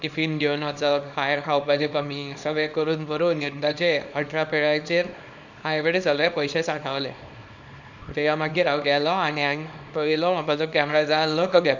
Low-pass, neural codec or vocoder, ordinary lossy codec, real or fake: 7.2 kHz; codec, 24 kHz, 0.9 kbps, WavTokenizer, small release; none; fake